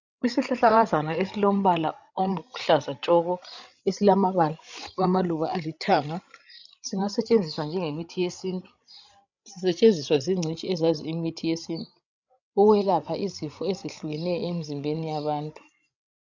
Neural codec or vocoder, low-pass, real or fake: codec, 16 kHz, 16 kbps, FreqCodec, larger model; 7.2 kHz; fake